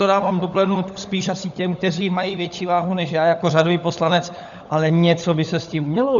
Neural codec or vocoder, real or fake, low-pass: codec, 16 kHz, 16 kbps, FunCodec, trained on LibriTTS, 50 frames a second; fake; 7.2 kHz